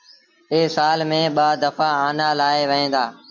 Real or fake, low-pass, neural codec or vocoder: real; 7.2 kHz; none